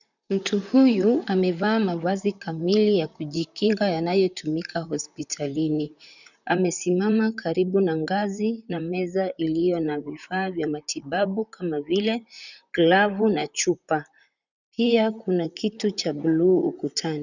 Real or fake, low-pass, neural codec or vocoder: fake; 7.2 kHz; vocoder, 44.1 kHz, 128 mel bands, Pupu-Vocoder